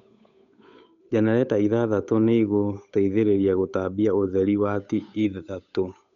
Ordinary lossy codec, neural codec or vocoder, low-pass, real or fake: none; codec, 16 kHz, 8 kbps, FunCodec, trained on Chinese and English, 25 frames a second; 7.2 kHz; fake